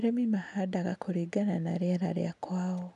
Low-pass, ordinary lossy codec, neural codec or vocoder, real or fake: 10.8 kHz; none; none; real